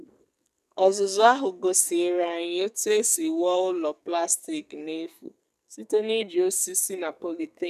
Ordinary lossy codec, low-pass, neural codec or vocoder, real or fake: none; 14.4 kHz; codec, 44.1 kHz, 2.6 kbps, SNAC; fake